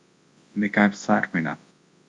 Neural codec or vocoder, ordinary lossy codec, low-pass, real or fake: codec, 24 kHz, 0.9 kbps, WavTokenizer, large speech release; MP3, 64 kbps; 10.8 kHz; fake